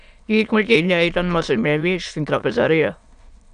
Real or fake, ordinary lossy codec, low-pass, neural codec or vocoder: fake; AAC, 96 kbps; 9.9 kHz; autoencoder, 22.05 kHz, a latent of 192 numbers a frame, VITS, trained on many speakers